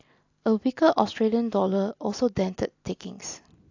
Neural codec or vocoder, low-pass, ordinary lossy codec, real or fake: none; 7.2 kHz; AAC, 48 kbps; real